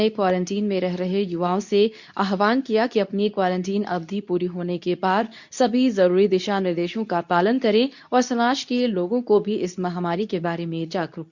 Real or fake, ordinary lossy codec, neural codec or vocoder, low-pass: fake; none; codec, 24 kHz, 0.9 kbps, WavTokenizer, medium speech release version 2; 7.2 kHz